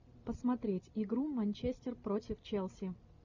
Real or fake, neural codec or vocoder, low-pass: real; none; 7.2 kHz